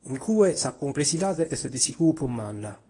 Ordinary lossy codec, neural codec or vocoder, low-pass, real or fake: AAC, 32 kbps; codec, 24 kHz, 0.9 kbps, WavTokenizer, medium speech release version 1; 10.8 kHz; fake